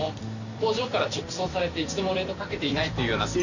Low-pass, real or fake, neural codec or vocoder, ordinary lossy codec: 7.2 kHz; fake; vocoder, 44.1 kHz, 128 mel bands every 256 samples, BigVGAN v2; none